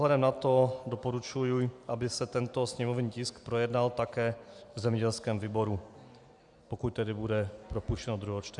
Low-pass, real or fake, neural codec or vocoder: 10.8 kHz; real; none